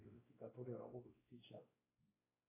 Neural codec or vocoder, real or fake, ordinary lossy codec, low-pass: codec, 16 kHz, 1 kbps, X-Codec, WavLM features, trained on Multilingual LibriSpeech; fake; AAC, 16 kbps; 3.6 kHz